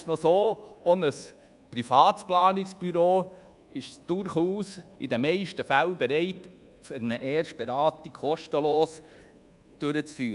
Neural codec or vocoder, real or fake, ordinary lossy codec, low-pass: codec, 24 kHz, 1.2 kbps, DualCodec; fake; none; 10.8 kHz